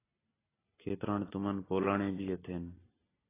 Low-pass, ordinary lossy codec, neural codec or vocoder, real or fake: 3.6 kHz; AAC, 16 kbps; none; real